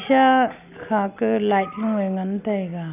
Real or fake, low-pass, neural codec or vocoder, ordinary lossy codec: real; 3.6 kHz; none; none